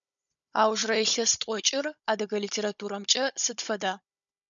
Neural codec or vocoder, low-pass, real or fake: codec, 16 kHz, 16 kbps, FunCodec, trained on Chinese and English, 50 frames a second; 7.2 kHz; fake